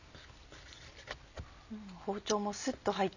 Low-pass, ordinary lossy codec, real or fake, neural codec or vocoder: 7.2 kHz; none; real; none